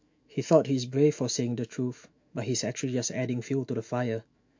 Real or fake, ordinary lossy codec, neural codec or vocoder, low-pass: fake; MP3, 48 kbps; autoencoder, 48 kHz, 128 numbers a frame, DAC-VAE, trained on Japanese speech; 7.2 kHz